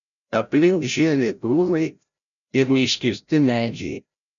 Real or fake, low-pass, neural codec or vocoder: fake; 7.2 kHz; codec, 16 kHz, 0.5 kbps, FreqCodec, larger model